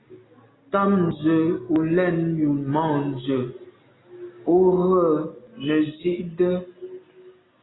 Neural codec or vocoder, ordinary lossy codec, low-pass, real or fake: none; AAC, 16 kbps; 7.2 kHz; real